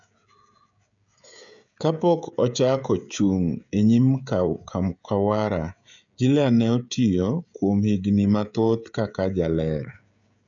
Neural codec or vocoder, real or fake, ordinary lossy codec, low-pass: codec, 16 kHz, 16 kbps, FreqCodec, smaller model; fake; none; 7.2 kHz